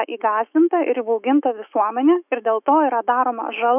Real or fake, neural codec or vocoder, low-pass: fake; autoencoder, 48 kHz, 128 numbers a frame, DAC-VAE, trained on Japanese speech; 3.6 kHz